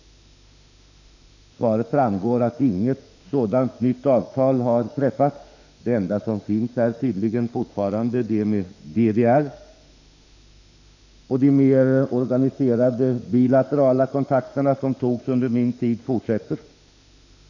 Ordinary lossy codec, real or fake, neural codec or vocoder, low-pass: none; fake; autoencoder, 48 kHz, 32 numbers a frame, DAC-VAE, trained on Japanese speech; 7.2 kHz